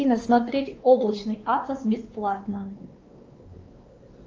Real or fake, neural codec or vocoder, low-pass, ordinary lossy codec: fake; codec, 16 kHz, 2 kbps, X-Codec, WavLM features, trained on Multilingual LibriSpeech; 7.2 kHz; Opus, 24 kbps